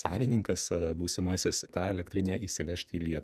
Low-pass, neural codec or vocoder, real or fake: 14.4 kHz; codec, 44.1 kHz, 2.6 kbps, SNAC; fake